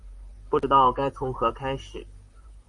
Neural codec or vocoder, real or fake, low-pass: vocoder, 44.1 kHz, 128 mel bands, Pupu-Vocoder; fake; 10.8 kHz